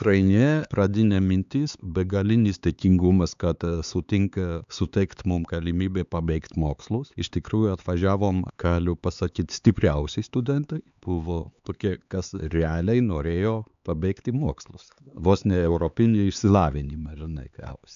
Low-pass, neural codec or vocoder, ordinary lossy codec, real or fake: 7.2 kHz; codec, 16 kHz, 4 kbps, X-Codec, HuBERT features, trained on LibriSpeech; AAC, 96 kbps; fake